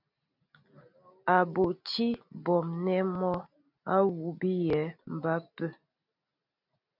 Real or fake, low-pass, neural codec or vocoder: real; 5.4 kHz; none